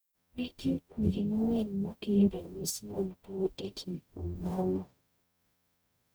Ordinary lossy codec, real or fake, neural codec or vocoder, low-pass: none; fake; codec, 44.1 kHz, 0.9 kbps, DAC; none